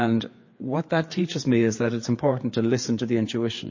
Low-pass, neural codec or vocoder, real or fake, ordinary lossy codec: 7.2 kHz; codec, 16 kHz, 4 kbps, FreqCodec, larger model; fake; MP3, 32 kbps